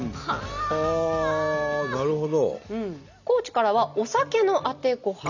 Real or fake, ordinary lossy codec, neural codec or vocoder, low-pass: real; none; none; 7.2 kHz